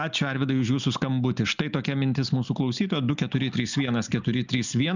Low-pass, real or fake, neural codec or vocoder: 7.2 kHz; real; none